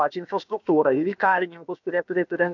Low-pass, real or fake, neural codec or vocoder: 7.2 kHz; fake; codec, 16 kHz, about 1 kbps, DyCAST, with the encoder's durations